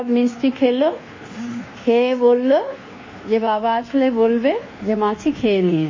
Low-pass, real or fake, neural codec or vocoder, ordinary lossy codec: 7.2 kHz; fake; codec, 24 kHz, 1.2 kbps, DualCodec; MP3, 32 kbps